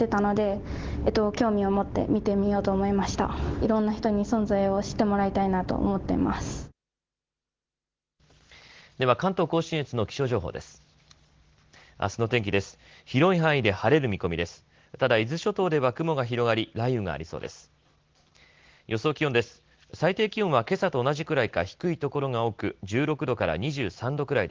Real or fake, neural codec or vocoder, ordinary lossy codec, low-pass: real; none; Opus, 16 kbps; 7.2 kHz